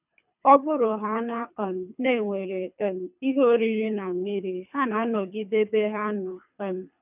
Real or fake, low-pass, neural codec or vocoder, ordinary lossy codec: fake; 3.6 kHz; codec, 24 kHz, 3 kbps, HILCodec; none